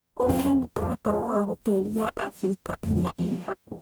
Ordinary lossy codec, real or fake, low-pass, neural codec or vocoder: none; fake; none; codec, 44.1 kHz, 0.9 kbps, DAC